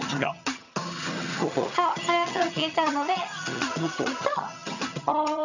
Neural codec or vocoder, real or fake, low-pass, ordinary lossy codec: vocoder, 22.05 kHz, 80 mel bands, HiFi-GAN; fake; 7.2 kHz; none